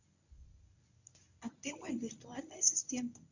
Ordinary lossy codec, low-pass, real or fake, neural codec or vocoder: none; 7.2 kHz; fake; codec, 24 kHz, 0.9 kbps, WavTokenizer, medium speech release version 1